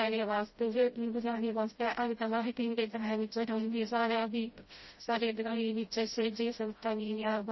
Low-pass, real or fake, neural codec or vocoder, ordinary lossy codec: 7.2 kHz; fake; codec, 16 kHz, 0.5 kbps, FreqCodec, smaller model; MP3, 24 kbps